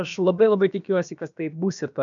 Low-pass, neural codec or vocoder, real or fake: 7.2 kHz; codec, 16 kHz, about 1 kbps, DyCAST, with the encoder's durations; fake